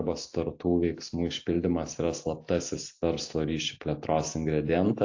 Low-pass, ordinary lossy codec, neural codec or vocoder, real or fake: 7.2 kHz; AAC, 48 kbps; none; real